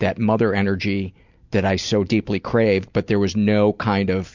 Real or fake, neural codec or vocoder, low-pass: real; none; 7.2 kHz